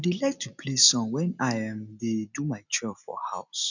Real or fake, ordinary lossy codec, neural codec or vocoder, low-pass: real; none; none; 7.2 kHz